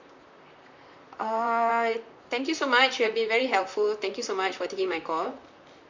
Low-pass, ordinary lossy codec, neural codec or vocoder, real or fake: 7.2 kHz; MP3, 64 kbps; vocoder, 44.1 kHz, 128 mel bands, Pupu-Vocoder; fake